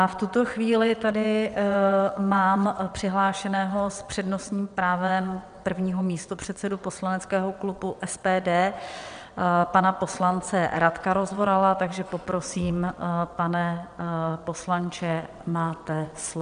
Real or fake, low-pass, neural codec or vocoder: fake; 9.9 kHz; vocoder, 22.05 kHz, 80 mel bands, WaveNeXt